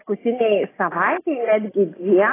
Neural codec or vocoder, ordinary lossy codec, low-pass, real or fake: none; AAC, 16 kbps; 3.6 kHz; real